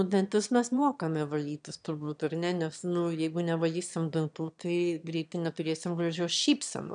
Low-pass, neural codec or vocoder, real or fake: 9.9 kHz; autoencoder, 22.05 kHz, a latent of 192 numbers a frame, VITS, trained on one speaker; fake